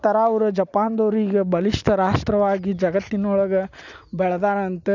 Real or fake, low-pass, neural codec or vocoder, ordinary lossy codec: real; 7.2 kHz; none; none